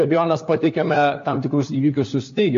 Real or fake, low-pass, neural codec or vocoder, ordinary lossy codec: fake; 7.2 kHz; codec, 16 kHz, 4 kbps, FunCodec, trained on LibriTTS, 50 frames a second; AAC, 48 kbps